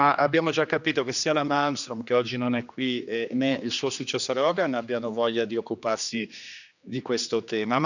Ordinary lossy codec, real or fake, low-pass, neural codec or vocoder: none; fake; 7.2 kHz; codec, 16 kHz, 2 kbps, X-Codec, HuBERT features, trained on general audio